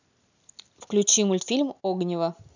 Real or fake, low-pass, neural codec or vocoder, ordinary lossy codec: real; 7.2 kHz; none; none